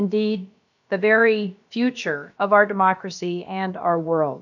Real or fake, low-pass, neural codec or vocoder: fake; 7.2 kHz; codec, 16 kHz, about 1 kbps, DyCAST, with the encoder's durations